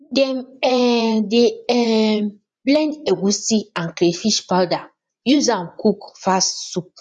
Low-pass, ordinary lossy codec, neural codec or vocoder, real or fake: 10.8 kHz; none; vocoder, 24 kHz, 100 mel bands, Vocos; fake